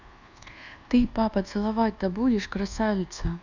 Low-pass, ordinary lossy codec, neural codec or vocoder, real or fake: 7.2 kHz; none; codec, 24 kHz, 1.2 kbps, DualCodec; fake